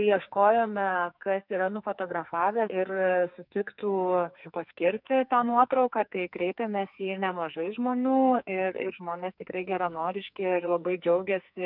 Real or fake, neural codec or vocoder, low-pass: fake; codec, 44.1 kHz, 2.6 kbps, SNAC; 5.4 kHz